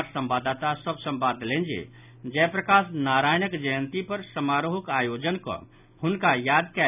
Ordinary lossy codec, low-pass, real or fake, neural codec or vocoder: none; 3.6 kHz; real; none